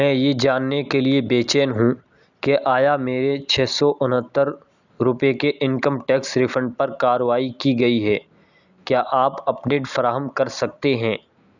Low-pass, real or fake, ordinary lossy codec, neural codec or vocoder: 7.2 kHz; real; none; none